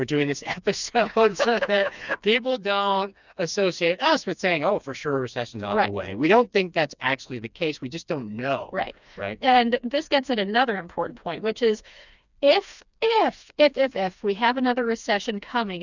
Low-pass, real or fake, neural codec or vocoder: 7.2 kHz; fake; codec, 16 kHz, 2 kbps, FreqCodec, smaller model